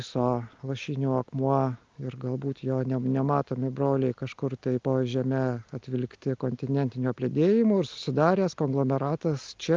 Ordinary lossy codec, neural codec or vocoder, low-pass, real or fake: Opus, 24 kbps; none; 7.2 kHz; real